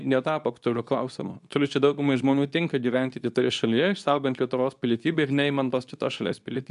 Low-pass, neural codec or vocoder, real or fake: 10.8 kHz; codec, 24 kHz, 0.9 kbps, WavTokenizer, medium speech release version 2; fake